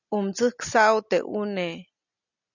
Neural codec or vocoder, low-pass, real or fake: none; 7.2 kHz; real